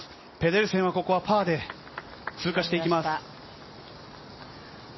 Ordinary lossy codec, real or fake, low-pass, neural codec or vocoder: MP3, 24 kbps; real; 7.2 kHz; none